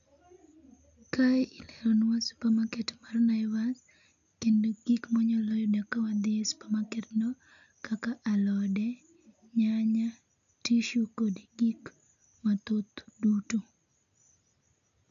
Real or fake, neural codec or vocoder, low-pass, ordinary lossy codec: real; none; 7.2 kHz; none